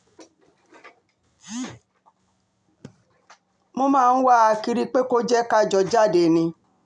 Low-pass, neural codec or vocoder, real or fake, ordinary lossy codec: 9.9 kHz; none; real; none